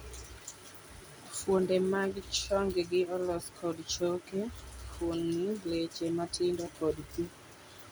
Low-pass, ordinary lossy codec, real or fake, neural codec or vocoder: none; none; real; none